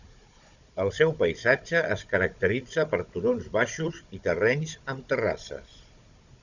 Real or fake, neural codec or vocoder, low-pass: fake; codec, 16 kHz, 16 kbps, FunCodec, trained on Chinese and English, 50 frames a second; 7.2 kHz